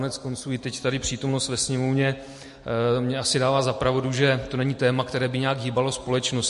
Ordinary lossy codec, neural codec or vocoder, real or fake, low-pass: MP3, 48 kbps; none; real; 14.4 kHz